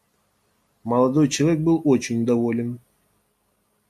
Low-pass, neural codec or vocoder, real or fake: 14.4 kHz; none; real